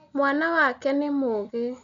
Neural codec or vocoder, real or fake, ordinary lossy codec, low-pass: none; real; none; 7.2 kHz